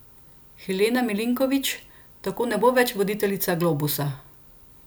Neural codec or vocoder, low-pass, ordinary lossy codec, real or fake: none; none; none; real